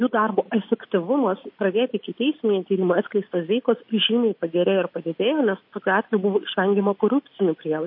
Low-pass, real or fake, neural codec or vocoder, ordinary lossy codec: 5.4 kHz; real; none; MP3, 32 kbps